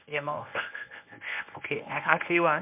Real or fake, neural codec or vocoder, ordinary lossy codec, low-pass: fake; codec, 24 kHz, 0.9 kbps, WavTokenizer, medium speech release version 1; MP3, 32 kbps; 3.6 kHz